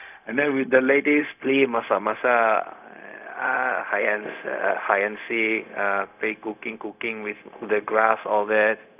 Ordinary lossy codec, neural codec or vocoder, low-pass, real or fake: none; codec, 16 kHz, 0.4 kbps, LongCat-Audio-Codec; 3.6 kHz; fake